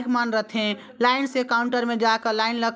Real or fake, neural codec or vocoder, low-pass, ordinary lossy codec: real; none; none; none